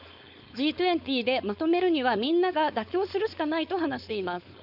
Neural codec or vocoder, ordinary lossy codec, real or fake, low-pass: codec, 16 kHz, 4.8 kbps, FACodec; none; fake; 5.4 kHz